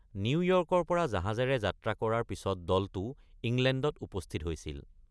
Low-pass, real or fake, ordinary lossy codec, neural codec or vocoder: none; real; none; none